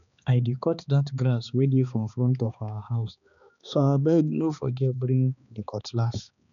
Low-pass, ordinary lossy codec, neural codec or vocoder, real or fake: 7.2 kHz; none; codec, 16 kHz, 2 kbps, X-Codec, HuBERT features, trained on balanced general audio; fake